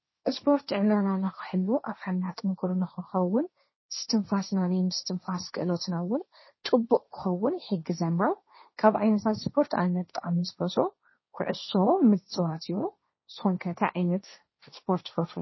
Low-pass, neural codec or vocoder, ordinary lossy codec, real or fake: 7.2 kHz; codec, 16 kHz, 1.1 kbps, Voila-Tokenizer; MP3, 24 kbps; fake